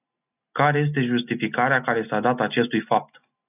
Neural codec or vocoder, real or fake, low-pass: none; real; 3.6 kHz